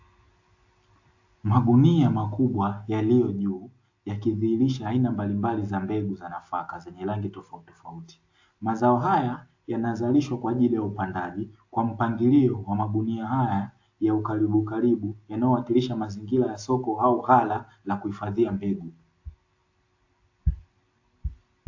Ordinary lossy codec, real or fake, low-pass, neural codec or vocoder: AAC, 48 kbps; real; 7.2 kHz; none